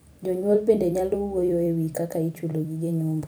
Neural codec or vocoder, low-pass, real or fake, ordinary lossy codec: vocoder, 44.1 kHz, 128 mel bands every 256 samples, BigVGAN v2; none; fake; none